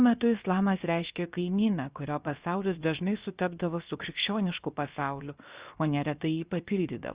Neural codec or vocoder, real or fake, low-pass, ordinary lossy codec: codec, 16 kHz, 0.7 kbps, FocalCodec; fake; 3.6 kHz; Opus, 64 kbps